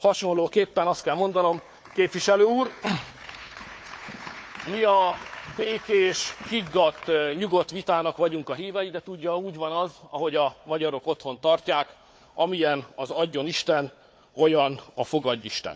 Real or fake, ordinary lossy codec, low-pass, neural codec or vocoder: fake; none; none; codec, 16 kHz, 4 kbps, FunCodec, trained on Chinese and English, 50 frames a second